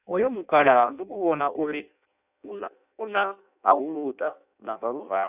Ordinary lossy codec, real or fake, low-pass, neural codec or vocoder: none; fake; 3.6 kHz; codec, 16 kHz in and 24 kHz out, 0.6 kbps, FireRedTTS-2 codec